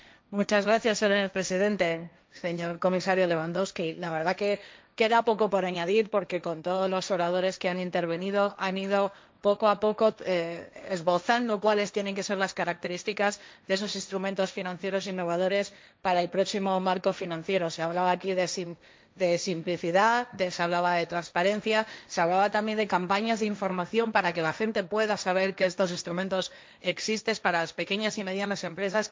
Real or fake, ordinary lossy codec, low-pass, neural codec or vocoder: fake; none; none; codec, 16 kHz, 1.1 kbps, Voila-Tokenizer